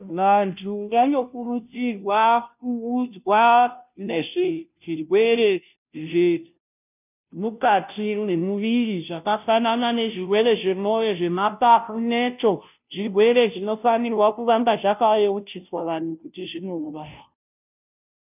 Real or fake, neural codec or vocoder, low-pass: fake; codec, 16 kHz, 0.5 kbps, FunCodec, trained on Chinese and English, 25 frames a second; 3.6 kHz